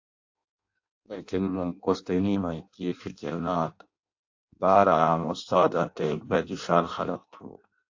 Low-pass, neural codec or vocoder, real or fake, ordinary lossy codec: 7.2 kHz; codec, 16 kHz in and 24 kHz out, 0.6 kbps, FireRedTTS-2 codec; fake; AAC, 48 kbps